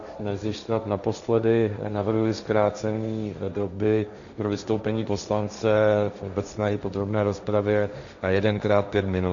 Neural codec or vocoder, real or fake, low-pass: codec, 16 kHz, 1.1 kbps, Voila-Tokenizer; fake; 7.2 kHz